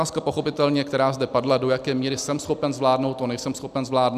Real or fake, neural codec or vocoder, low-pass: real; none; 14.4 kHz